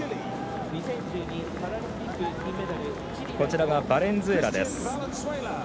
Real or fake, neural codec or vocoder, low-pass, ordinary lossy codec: real; none; none; none